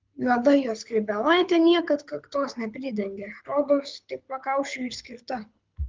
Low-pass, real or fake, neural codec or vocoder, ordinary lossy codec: 7.2 kHz; fake; codec, 24 kHz, 6 kbps, HILCodec; Opus, 24 kbps